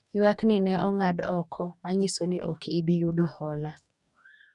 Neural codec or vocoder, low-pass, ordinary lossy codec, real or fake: codec, 44.1 kHz, 2.6 kbps, DAC; 10.8 kHz; none; fake